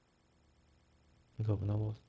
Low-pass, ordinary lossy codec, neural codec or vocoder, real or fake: none; none; codec, 16 kHz, 0.4 kbps, LongCat-Audio-Codec; fake